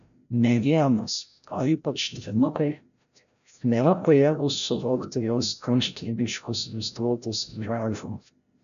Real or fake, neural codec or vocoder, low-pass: fake; codec, 16 kHz, 0.5 kbps, FreqCodec, larger model; 7.2 kHz